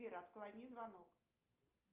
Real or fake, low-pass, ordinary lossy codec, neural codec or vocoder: real; 3.6 kHz; Opus, 24 kbps; none